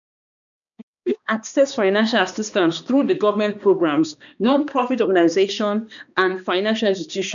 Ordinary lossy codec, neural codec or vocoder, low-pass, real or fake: none; codec, 16 kHz, 2 kbps, X-Codec, HuBERT features, trained on balanced general audio; 7.2 kHz; fake